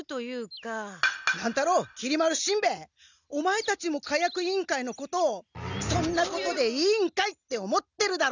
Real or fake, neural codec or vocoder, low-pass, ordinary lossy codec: real; none; 7.2 kHz; none